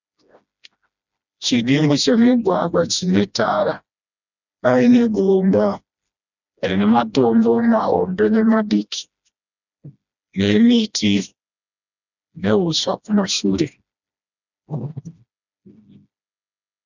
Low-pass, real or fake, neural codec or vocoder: 7.2 kHz; fake; codec, 16 kHz, 1 kbps, FreqCodec, smaller model